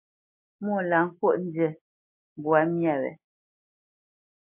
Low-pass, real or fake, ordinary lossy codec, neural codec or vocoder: 3.6 kHz; real; MP3, 32 kbps; none